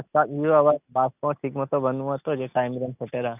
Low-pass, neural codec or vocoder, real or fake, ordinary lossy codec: 3.6 kHz; none; real; none